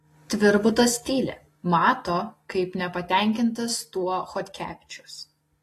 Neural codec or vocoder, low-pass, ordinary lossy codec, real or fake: none; 14.4 kHz; AAC, 48 kbps; real